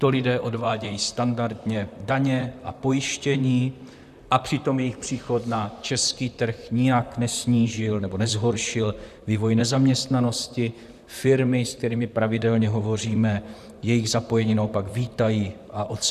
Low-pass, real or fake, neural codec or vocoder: 14.4 kHz; fake; vocoder, 44.1 kHz, 128 mel bands, Pupu-Vocoder